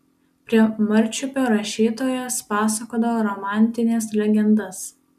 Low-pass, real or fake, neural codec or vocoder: 14.4 kHz; real; none